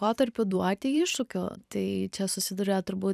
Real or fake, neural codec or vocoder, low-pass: fake; vocoder, 44.1 kHz, 128 mel bands every 256 samples, BigVGAN v2; 14.4 kHz